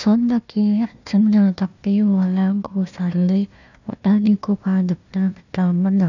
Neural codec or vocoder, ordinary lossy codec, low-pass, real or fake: codec, 16 kHz, 1 kbps, FunCodec, trained on Chinese and English, 50 frames a second; none; 7.2 kHz; fake